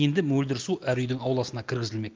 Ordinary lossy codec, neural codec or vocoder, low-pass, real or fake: Opus, 32 kbps; none; 7.2 kHz; real